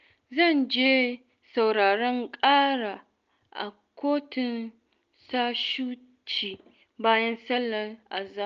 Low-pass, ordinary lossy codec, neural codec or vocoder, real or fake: 7.2 kHz; Opus, 24 kbps; none; real